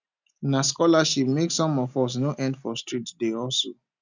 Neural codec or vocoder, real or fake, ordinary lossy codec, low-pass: none; real; none; 7.2 kHz